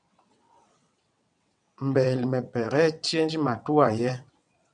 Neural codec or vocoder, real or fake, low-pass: vocoder, 22.05 kHz, 80 mel bands, WaveNeXt; fake; 9.9 kHz